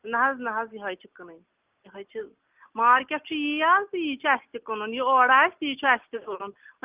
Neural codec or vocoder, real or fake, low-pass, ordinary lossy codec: none; real; 3.6 kHz; Opus, 24 kbps